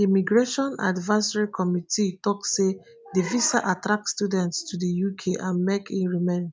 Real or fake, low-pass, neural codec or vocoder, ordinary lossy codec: real; none; none; none